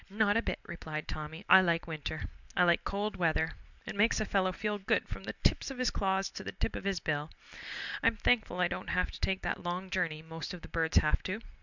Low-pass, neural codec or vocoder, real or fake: 7.2 kHz; none; real